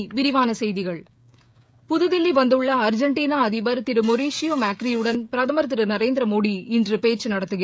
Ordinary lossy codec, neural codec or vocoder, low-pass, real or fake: none; codec, 16 kHz, 16 kbps, FreqCodec, smaller model; none; fake